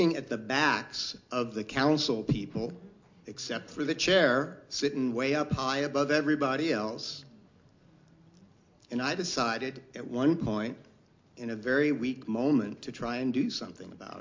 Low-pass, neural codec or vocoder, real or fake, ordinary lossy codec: 7.2 kHz; none; real; MP3, 48 kbps